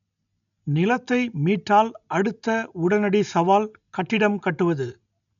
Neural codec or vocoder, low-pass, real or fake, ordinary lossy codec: none; 7.2 kHz; real; none